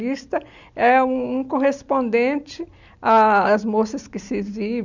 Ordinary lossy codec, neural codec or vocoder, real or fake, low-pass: none; none; real; 7.2 kHz